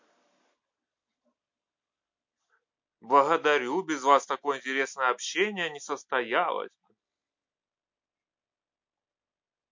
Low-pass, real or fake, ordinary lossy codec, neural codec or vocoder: 7.2 kHz; real; MP3, 48 kbps; none